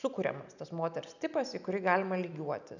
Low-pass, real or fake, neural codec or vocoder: 7.2 kHz; real; none